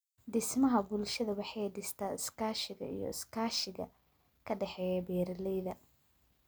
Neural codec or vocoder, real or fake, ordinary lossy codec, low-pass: none; real; none; none